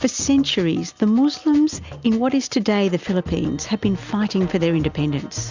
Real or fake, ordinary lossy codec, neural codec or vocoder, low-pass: real; Opus, 64 kbps; none; 7.2 kHz